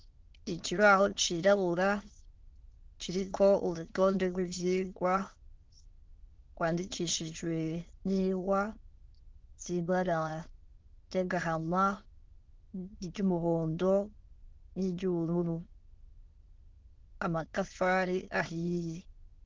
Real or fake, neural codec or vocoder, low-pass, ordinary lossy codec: fake; autoencoder, 22.05 kHz, a latent of 192 numbers a frame, VITS, trained on many speakers; 7.2 kHz; Opus, 16 kbps